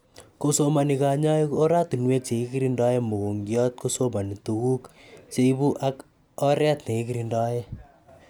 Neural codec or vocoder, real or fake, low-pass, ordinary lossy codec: none; real; none; none